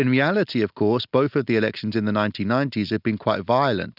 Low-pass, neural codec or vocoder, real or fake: 5.4 kHz; none; real